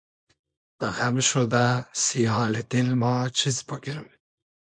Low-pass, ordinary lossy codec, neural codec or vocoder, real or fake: 9.9 kHz; MP3, 48 kbps; codec, 24 kHz, 0.9 kbps, WavTokenizer, small release; fake